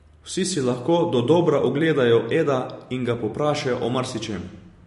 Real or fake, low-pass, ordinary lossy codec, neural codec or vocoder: fake; 14.4 kHz; MP3, 48 kbps; vocoder, 44.1 kHz, 128 mel bands every 256 samples, BigVGAN v2